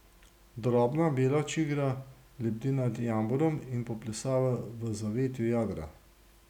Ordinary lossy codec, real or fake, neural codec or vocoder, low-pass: none; real; none; 19.8 kHz